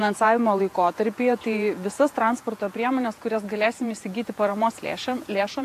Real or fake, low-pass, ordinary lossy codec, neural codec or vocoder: fake; 14.4 kHz; AAC, 64 kbps; vocoder, 48 kHz, 128 mel bands, Vocos